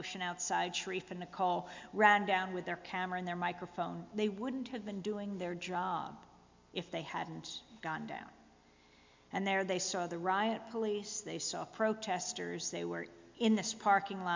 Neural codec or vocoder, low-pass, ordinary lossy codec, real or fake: none; 7.2 kHz; MP3, 64 kbps; real